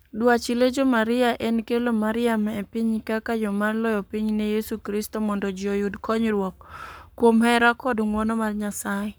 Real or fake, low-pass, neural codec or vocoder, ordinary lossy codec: fake; none; codec, 44.1 kHz, 7.8 kbps, Pupu-Codec; none